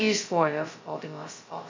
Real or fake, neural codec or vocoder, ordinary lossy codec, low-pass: fake; codec, 16 kHz, 0.2 kbps, FocalCodec; MP3, 48 kbps; 7.2 kHz